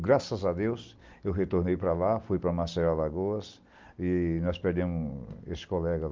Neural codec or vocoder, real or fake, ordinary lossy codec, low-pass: none; real; Opus, 24 kbps; 7.2 kHz